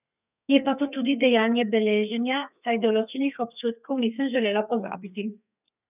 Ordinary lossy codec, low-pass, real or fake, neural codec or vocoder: none; 3.6 kHz; fake; codec, 32 kHz, 1.9 kbps, SNAC